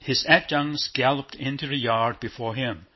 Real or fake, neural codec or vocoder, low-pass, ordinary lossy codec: real; none; 7.2 kHz; MP3, 24 kbps